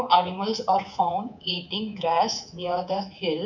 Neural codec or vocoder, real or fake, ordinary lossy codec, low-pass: codec, 24 kHz, 3.1 kbps, DualCodec; fake; none; 7.2 kHz